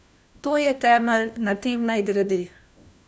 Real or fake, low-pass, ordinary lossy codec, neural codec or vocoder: fake; none; none; codec, 16 kHz, 1 kbps, FunCodec, trained on LibriTTS, 50 frames a second